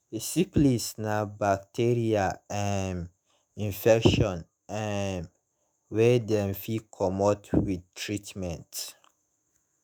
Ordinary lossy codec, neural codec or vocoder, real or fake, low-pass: none; autoencoder, 48 kHz, 128 numbers a frame, DAC-VAE, trained on Japanese speech; fake; none